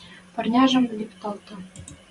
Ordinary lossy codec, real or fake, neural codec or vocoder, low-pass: Opus, 64 kbps; fake; vocoder, 44.1 kHz, 128 mel bands every 512 samples, BigVGAN v2; 10.8 kHz